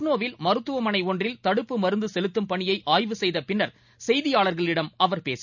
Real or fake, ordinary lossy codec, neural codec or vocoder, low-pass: real; none; none; 7.2 kHz